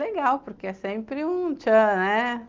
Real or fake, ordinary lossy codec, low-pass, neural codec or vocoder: real; Opus, 32 kbps; 7.2 kHz; none